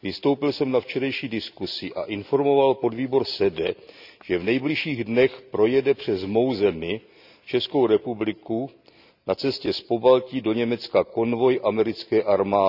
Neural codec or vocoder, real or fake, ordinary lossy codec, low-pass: none; real; none; 5.4 kHz